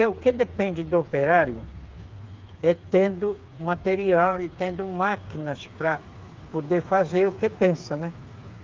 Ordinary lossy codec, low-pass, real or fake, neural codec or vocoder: Opus, 24 kbps; 7.2 kHz; fake; codec, 16 kHz, 4 kbps, FreqCodec, smaller model